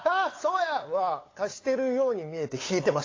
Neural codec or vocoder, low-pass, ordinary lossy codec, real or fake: codec, 16 kHz, 8 kbps, FunCodec, trained on LibriTTS, 25 frames a second; 7.2 kHz; AAC, 32 kbps; fake